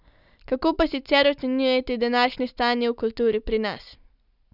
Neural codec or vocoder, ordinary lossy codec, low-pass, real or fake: none; none; 5.4 kHz; real